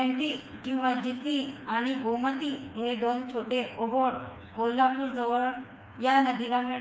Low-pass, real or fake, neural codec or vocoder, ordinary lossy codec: none; fake; codec, 16 kHz, 2 kbps, FreqCodec, smaller model; none